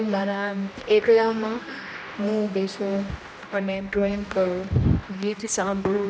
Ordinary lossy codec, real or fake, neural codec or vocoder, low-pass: none; fake; codec, 16 kHz, 1 kbps, X-Codec, HuBERT features, trained on general audio; none